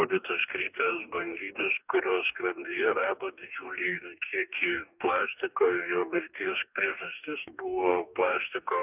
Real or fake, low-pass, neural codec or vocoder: fake; 3.6 kHz; codec, 44.1 kHz, 2.6 kbps, DAC